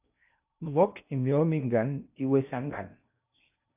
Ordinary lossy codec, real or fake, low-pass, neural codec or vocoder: AAC, 32 kbps; fake; 3.6 kHz; codec, 16 kHz in and 24 kHz out, 0.8 kbps, FocalCodec, streaming, 65536 codes